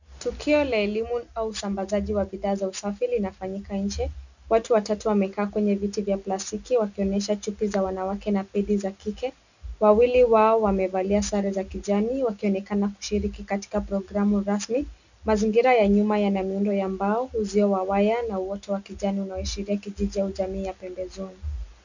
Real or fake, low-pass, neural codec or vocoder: real; 7.2 kHz; none